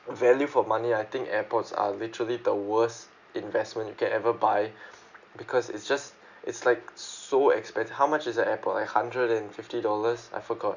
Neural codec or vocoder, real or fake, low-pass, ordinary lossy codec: none; real; 7.2 kHz; none